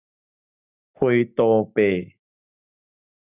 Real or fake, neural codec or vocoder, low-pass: real; none; 3.6 kHz